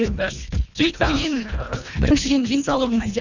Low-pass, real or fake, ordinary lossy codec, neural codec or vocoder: 7.2 kHz; fake; none; codec, 24 kHz, 1.5 kbps, HILCodec